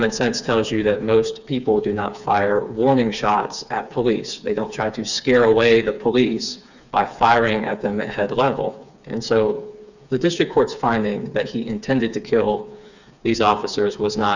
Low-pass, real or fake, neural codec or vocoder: 7.2 kHz; fake; codec, 16 kHz, 4 kbps, FreqCodec, smaller model